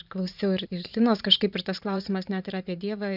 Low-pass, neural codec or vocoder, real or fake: 5.4 kHz; vocoder, 44.1 kHz, 128 mel bands every 512 samples, BigVGAN v2; fake